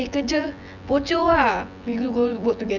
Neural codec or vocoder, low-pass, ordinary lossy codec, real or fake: vocoder, 24 kHz, 100 mel bands, Vocos; 7.2 kHz; none; fake